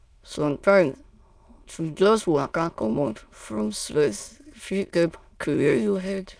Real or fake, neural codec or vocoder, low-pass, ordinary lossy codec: fake; autoencoder, 22.05 kHz, a latent of 192 numbers a frame, VITS, trained on many speakers; none; none